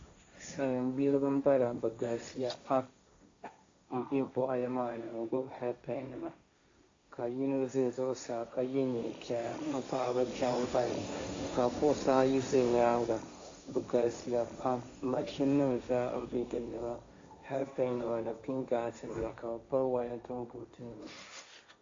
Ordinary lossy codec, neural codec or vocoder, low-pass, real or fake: AAC, 48 kbps; codec, 16 kHz, 1.1 kbps, Voila-Tokenizer; 7.2 kHz; fake